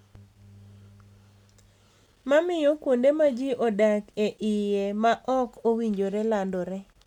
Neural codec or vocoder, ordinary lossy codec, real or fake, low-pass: none; none; real; 19.8 kHz